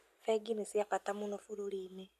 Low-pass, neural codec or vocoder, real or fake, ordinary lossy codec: 14.4 kHz; none; real; none